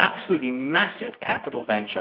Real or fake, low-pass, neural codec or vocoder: fake; 5.4 kHz; codec, 24 kHz, 0.9 kbps, WavTokenizer, medium music audio release